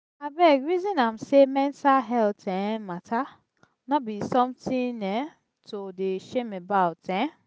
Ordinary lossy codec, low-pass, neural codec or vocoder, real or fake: none; none; none; real